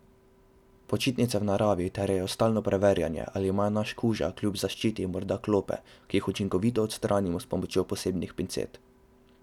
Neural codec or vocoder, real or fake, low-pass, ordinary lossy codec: none; real; 19.8 kHz; none